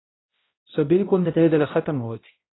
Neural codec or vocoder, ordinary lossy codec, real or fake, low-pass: codec, 16 kHz, 0.5 kbps, X-Codec, HuBERT features, trained on balanced general audio; AAC, 16 kbps; fake; 7.2 kHz